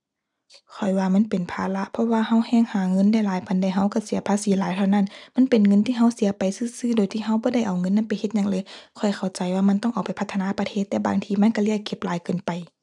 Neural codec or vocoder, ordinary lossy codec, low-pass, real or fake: none; none; none; real